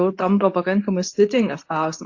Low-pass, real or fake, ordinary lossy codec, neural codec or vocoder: 7.2 kHz; fake; AAC, 48 kbps; codec, 24 kHz, 0.9 kbps, WavTokenizer, medium speech release version 2